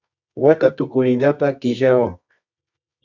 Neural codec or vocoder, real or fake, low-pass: codec, 24 kHz, 0.9 kbps, WavTokenizer, medium music audio release; fake; 7.2 kHz